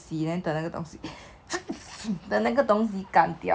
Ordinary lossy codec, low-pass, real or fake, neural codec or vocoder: none; none; real; none